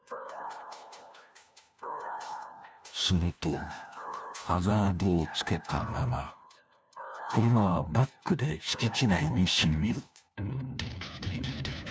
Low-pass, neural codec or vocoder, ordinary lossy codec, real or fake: none; codec, 16 kHz, 1 kbps, FunCodec, trained on LibriTTS, 50 frames a second; none; fake